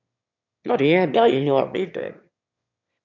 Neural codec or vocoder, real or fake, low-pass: autoencoder, 22.05 kHz, a latent of 192 numbers a frame, VITS, trained on one speaker; fake; 7.2 kHz